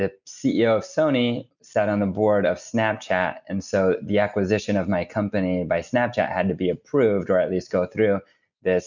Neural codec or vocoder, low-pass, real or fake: none; 7.2 kHz; real